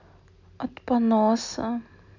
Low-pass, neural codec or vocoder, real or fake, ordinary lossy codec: 7.2 kHz; none; real; none